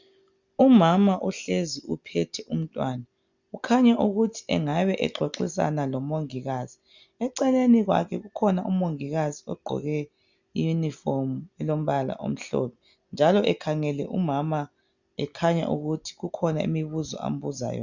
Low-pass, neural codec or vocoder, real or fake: 7.2 kHz; none; real